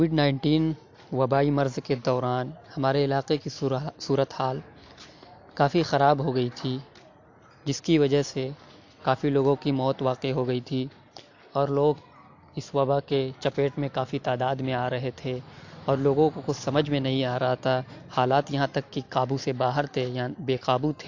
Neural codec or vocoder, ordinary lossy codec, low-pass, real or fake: none; AAC, 48 kbps; 7.2 kHz; real